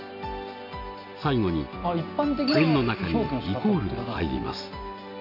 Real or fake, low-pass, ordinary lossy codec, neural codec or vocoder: real; 5.4 kHz; none; none